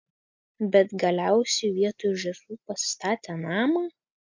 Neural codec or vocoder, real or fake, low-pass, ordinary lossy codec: none; real; 7.2 kHz; MP3, 64 kbps